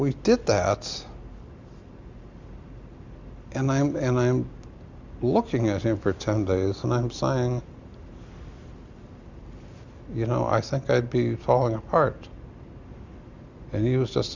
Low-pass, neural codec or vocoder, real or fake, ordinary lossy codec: 7.2 kHz; none; real; Opus, 64 kbps